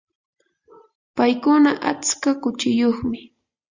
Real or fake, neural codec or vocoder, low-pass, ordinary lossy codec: real; none; 7.2 kHz; Opus, 64 kbps